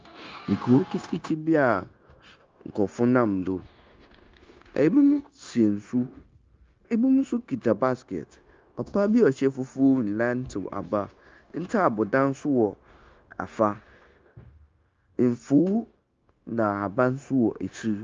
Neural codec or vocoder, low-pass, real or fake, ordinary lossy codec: codec, 16 kHz, 0.9 kbps, LongCat-Audio-Codec; 7.2 kHz; fake; Opus, 24 kbps